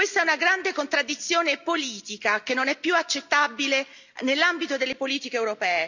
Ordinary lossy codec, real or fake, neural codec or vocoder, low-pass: none; real; none; 7.2 kHz